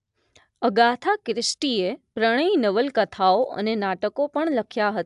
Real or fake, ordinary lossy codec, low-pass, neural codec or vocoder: real; AAC, 96 kbps; 10.8 kHz; none